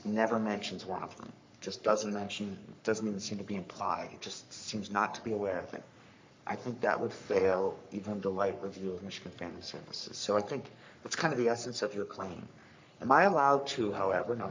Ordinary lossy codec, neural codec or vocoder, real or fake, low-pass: MP3, 48 kbps; codec, 44.1 kHz, 3.4 kbps, Pupu-Codec; fake; 7.2 kHz